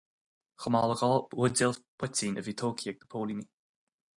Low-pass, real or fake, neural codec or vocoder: 10.8 kHz; real; none